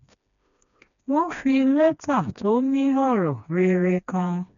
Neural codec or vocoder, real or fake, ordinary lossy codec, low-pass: codec, 16 kHz, 2 kbps, FreqCodec, smaller model; fake; none; 7.2 kHz